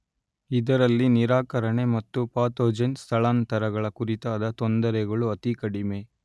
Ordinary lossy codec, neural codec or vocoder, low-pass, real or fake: none; none; none; real